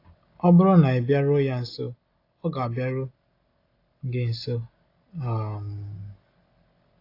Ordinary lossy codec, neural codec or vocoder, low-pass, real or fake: AAC, 32 kbps; none; 5.4 kHz; real